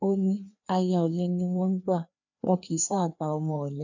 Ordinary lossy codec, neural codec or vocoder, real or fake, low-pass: none; codec, 16 kHz, 2 kbps, FreqCodec, larger model; fake; 7.2 kHz